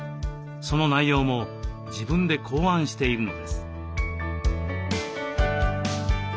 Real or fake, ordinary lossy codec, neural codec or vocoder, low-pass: real; none; none; none